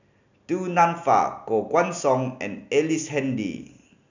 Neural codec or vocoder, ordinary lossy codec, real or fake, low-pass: none; none; real; 7.2 kHz